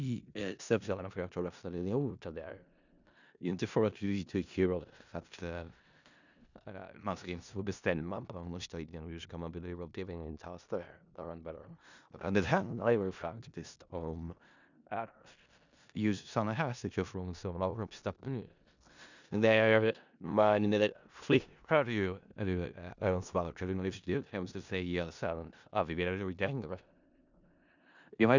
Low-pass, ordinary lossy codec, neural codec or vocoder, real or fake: 7.2 kHz; none; codec, 16 kHz in and 24 kHz out, 0.4 kbps, LongCat-Audio-Codec, four codebook decoder; fake